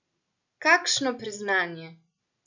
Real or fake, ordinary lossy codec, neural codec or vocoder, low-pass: real; none; none; 7.2 kHz